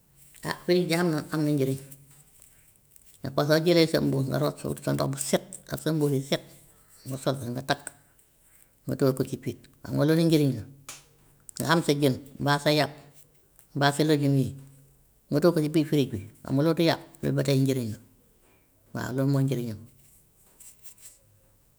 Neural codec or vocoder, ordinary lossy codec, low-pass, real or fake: autoencoder, 48 kHz, 128 numbers a frame, DAC-VAE, trained on Japanese speech; none; none; fake